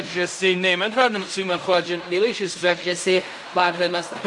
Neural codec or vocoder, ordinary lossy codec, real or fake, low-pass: codec, 16 kHz in and 24 kHz out, 0.4 kbps, LongCat-Audio-Codec, fine tuned four codebook decoder; AAC, 64 kbps; fake; 10.8 kHz